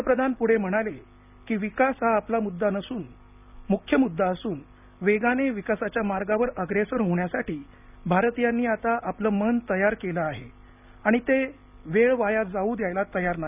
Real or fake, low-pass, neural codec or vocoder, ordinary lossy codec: real; 3.6 kHz; none; none